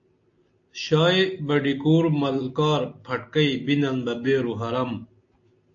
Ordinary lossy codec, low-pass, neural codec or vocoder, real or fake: AAC, 48 kbps; 7.2 kHz; none; real